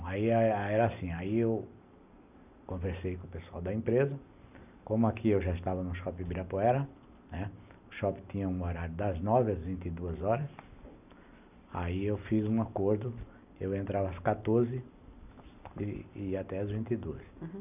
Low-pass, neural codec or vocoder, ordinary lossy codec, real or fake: 3.6 kHz; none; none; real